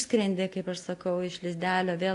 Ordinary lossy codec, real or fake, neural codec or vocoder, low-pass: AAC, 48 kbps; real; none; 10.8 kHz